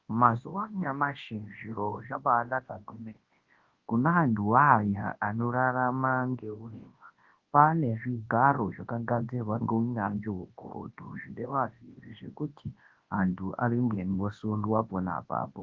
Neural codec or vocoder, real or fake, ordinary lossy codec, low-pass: codec, 24 kHz, 0.9 kbps, WavTokenizer, large speech release; fake; Opus, 16 kbps; 7.2 kHz